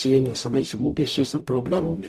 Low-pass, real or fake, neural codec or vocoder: 14.4 kHz; fake; codec, 44.1 kHz, 0.9 kbps, DAC